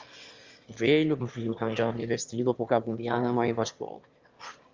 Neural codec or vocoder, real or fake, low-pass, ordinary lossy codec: autoencoder, 22.05 kHz, a latent of 192 numbers a frame, VITS, trained on one speaker; fake; 7.2 kHz; Opus, 32 kbps